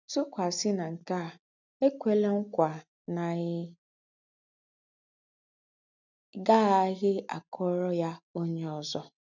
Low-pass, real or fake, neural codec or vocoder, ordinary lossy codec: 7.2 kHz; real; none; none